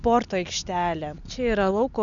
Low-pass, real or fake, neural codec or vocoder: 7.2 kHz; real; none